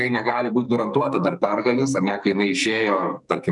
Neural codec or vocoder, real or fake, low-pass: codec, 44.1 kHz, 2.6 kbps, SNAC; fake; 10.8 kHz